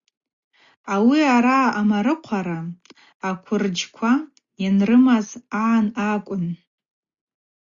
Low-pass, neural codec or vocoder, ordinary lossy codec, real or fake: 7.2 kHz; none; Opus, 64 kbps; real